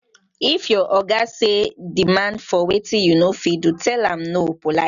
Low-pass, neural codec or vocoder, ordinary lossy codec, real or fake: 7.2 kHz; none; none; real